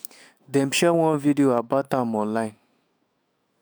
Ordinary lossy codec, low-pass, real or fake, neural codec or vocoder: none; none; fake; autoencoder, 48 kHz, 128 numbers a frame, DAC-VAE, trained on Japanese speech